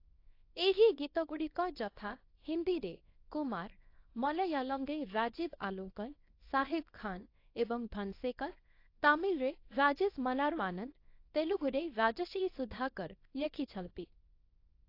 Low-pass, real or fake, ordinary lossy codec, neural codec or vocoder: 5.4 kHz; fake; AAC, 32 kbps; codec, 24 kHz, 0.9 kbps, WavTokenizer, small release